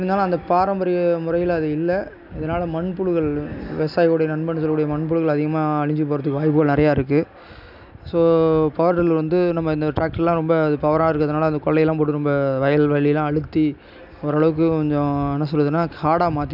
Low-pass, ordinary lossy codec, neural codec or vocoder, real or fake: 5.4 kHz; none; none; real